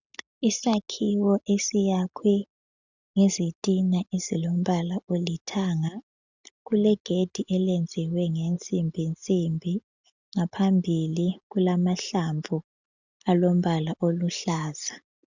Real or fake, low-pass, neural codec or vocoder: real; 7.2 kHz; none